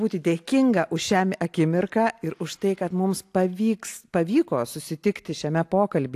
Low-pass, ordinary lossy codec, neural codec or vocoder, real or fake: 14.4 kHz; AAC, 64 kbps; none; real